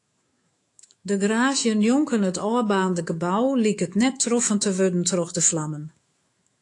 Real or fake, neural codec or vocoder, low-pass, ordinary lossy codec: fake; autoencoder, 48 kHz, 128 numbers a frame, DAC-VAE, trained on Japanese speech; 10.8 kHz; AAC, 48 kbps